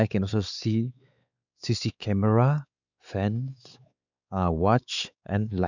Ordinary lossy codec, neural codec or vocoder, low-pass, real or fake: none; codec, 16 kHz, 4 kbps, X-Codec, WavLM features, trained on Multilingual LibriSpeech; 7.2 kHz; fake